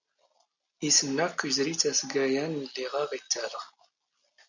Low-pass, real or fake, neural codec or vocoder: 7.2 kHz; real; none